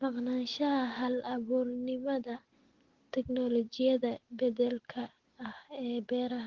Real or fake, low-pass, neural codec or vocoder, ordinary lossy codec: real; 7.2 kHz; none; Opus, 16 kbps